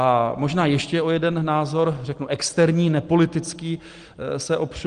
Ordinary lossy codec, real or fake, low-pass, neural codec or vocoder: Opus, 24 kbps; real; 9.9 kHz; none